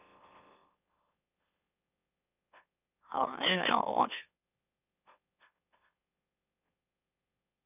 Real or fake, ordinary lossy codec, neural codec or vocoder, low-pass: fake; none; autoencoder, 44.1 kHz, a latent of 192 numbers a frame, MeloTTS; 3.6 kHz